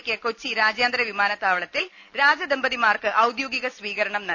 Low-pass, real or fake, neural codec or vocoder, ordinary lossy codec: 7.2 kHz; real; none; none